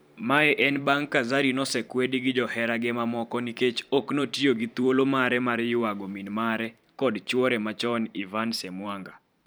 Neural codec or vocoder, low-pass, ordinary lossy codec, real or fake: vocoder, 44.1 kHz, 128 mel bands every 512 samples, BigVGAN v2; none; none; fake